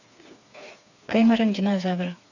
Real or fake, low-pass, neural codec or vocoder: fake; 7.2 kHz; codec, 16 kHz, 4 kbps, FreqCodec, smaller model